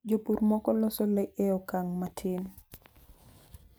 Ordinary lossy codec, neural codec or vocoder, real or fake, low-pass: none; none; real; none